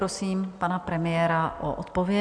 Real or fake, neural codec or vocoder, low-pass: real; none; 9.9 kHz